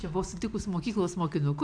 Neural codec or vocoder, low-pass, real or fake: none; 9.9 kHz; real